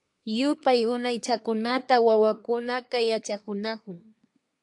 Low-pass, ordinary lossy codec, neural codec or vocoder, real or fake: 10.8 kHz; AAC, 64 kbps; codec, 24 kHz, 1 kbps, SNAC; fake